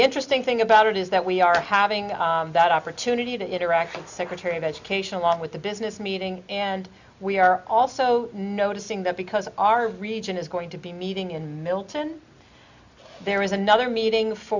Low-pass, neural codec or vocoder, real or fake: 7.2 kHz; none; real